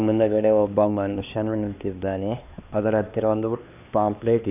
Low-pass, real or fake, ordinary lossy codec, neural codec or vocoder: 3.6 kHz; fake; none; codec, 16 kHz, 2 kbps, X-Codec, HuBERT features, trained on LibriSpeech